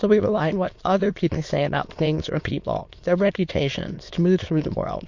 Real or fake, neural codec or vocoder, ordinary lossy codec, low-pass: fake; autoencoder, 22.05 kHz, a latent of 192 numbers a frame, VITS, trained on many speakers; MP3, 48 kbps; 7.2 kHz